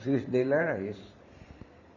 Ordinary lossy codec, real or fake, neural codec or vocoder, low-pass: none; fake; vocoder, 44.1 kHz, 128 mel bands every 512 samples, BigVGAN v2; 7.2 kHz